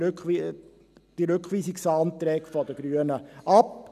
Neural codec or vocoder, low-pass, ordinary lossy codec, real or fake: none; 14.4 kHz; none; real